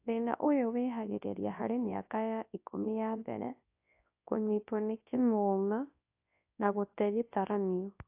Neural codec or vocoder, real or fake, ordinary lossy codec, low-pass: codec, 24 kHz, 0.9 kbps, WavTokenizer, large speech release; fake; AAC, 32 kbps; 3.6 kHz